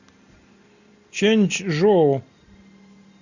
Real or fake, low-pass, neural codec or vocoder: real; 7.2 kHz; none